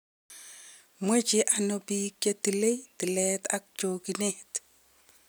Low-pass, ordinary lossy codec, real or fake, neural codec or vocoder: none; none; real; none